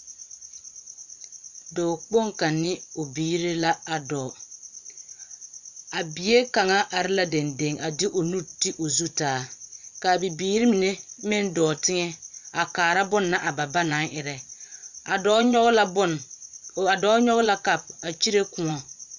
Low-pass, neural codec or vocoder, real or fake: 7.2 kHz; vocoder, 24 kHz, 100 mel bands, Vocos; fake